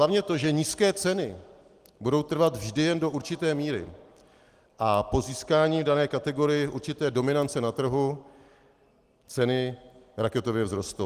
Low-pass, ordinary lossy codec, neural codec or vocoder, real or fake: 14.4 kHz; Opus, 24 kbps; none; real